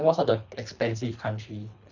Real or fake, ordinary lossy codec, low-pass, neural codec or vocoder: fake; none; 7.2 kHz; codec, 24 kHz, 3 kbps, HILCodec